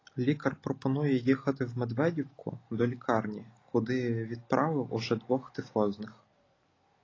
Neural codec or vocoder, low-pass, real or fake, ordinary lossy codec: none; 7.2 kHz; real; AAC, 32 kbps